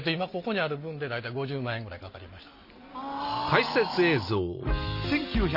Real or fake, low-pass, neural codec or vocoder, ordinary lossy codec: real; 5.4 kHz; none; none